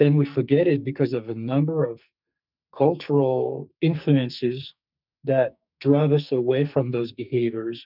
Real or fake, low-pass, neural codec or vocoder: fake; 5.4 kHz; codec, 44.1 kHz, 2.6 kbps, SNAC